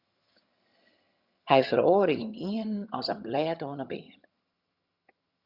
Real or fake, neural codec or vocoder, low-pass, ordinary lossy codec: fake; vocoder, 22.05 kHz, 80 mel bands, HiFi-GAN; 5.4 kHz; Opus, 64 kbps